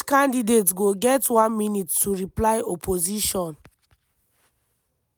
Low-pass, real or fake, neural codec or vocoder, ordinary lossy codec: none; real; none; none